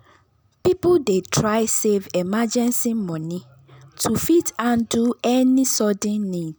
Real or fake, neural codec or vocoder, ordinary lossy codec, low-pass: real; none; none; none